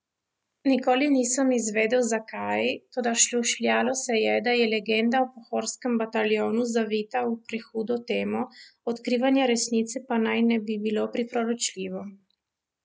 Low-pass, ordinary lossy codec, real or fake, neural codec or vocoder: none; none; real; none